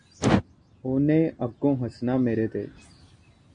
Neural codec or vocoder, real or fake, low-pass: none; real; 9.9 kHz